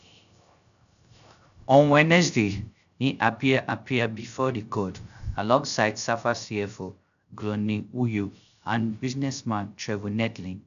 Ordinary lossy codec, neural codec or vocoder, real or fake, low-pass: none; codec, 16 kHz, 0.3 kbps, FocalCodec; fake; 7.2 kHz